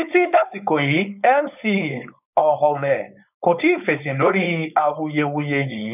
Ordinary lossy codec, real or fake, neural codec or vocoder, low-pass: none; fake; codec, 16 kHz, 4.8 kbps, FACodec; 3.6 kHz